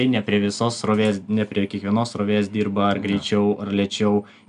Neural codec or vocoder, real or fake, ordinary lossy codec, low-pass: none; real; MP3, 96 kbps; 10.8 kHz